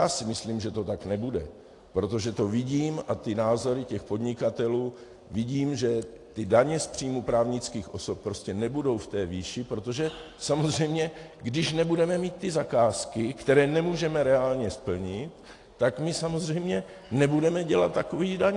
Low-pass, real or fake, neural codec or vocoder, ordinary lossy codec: 10.8 kHz; real; none; AAC, 48 kbps